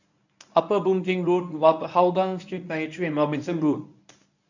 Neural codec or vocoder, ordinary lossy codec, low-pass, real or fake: codec, 24 kHz, 0.9 kbps, WavTokenizer, medium speech release version 1; none; 7.2 kHz; fake